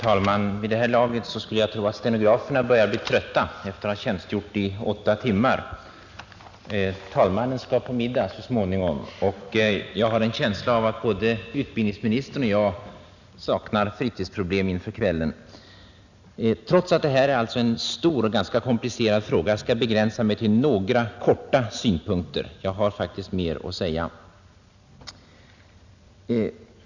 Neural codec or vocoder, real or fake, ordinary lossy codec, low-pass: none; real; none; 7.2 kHz